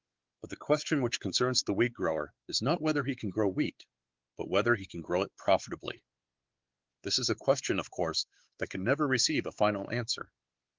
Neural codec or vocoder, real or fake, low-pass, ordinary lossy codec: codec, 16 kHz, 4 kbps, X-Codec, WavLM features, trained on Multilingual LibriSpeech; fake; 7.2 kHz; Opus, 16 kbps